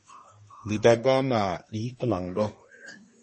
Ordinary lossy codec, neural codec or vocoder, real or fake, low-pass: MP3, 32 kbps; codec, 24 kHz, 1 kbps, SNAC; fake; 10.8 kHz